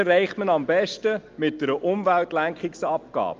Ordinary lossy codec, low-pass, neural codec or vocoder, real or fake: Opus, 32 kbps; 7.2 kHz; none; real